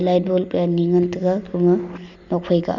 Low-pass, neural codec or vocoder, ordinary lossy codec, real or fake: 7.2 kHz; none; none; real